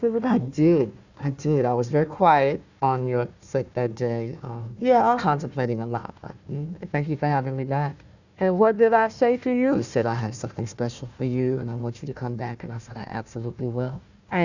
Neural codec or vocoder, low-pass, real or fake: codec, 16 kHz, 1 kbps, FunCodec, trained on Chinese and English, 50 frames a second; 7.2 kHz; fake